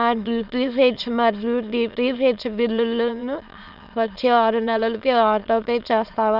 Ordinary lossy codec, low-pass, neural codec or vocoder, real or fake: none; 5.4 kHz; autoencoder, 22.05 kHz, a latent of 192 numbers a frame, VITS, trained on many speakers; fake